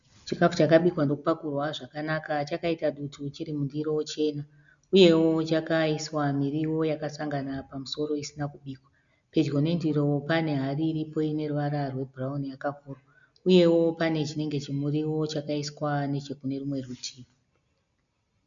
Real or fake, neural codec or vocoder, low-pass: real; none; 7.2 kHz